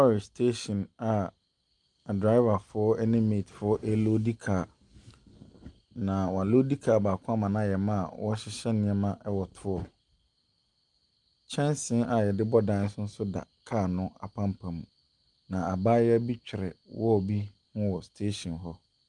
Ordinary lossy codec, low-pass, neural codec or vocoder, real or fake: Opus, 32 kbps; 10.8 kHz; none; real